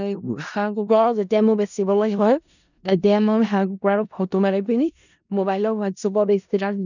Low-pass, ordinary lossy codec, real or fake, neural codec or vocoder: 7.2 kHz; none; fake; codec, 16 kHz in and 24 kHz out, 0.4 kbps, LongCat-Audio-Codec, four codebook decoder